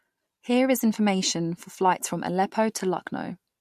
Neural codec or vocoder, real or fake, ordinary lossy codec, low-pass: none; real; MP3, 64 kbps; 14.4 kHz